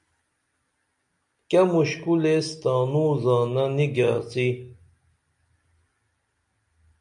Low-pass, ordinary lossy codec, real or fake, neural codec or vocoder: 10.8 kHz; MP3, 96 kbps; real; none